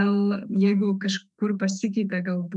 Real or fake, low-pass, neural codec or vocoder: fake; 10.8 kHz; autoencoder, 48 kHz, 32 numbers a frame, DAC-VAE, trained on Japanese speech